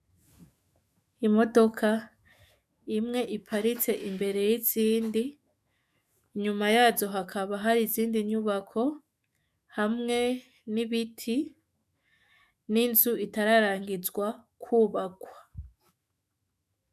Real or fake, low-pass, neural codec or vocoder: fake; 14.4 kHz; autoencoder, 48 kHz, 128 numbers a frame, DAC-VAE, trained on Japanese speech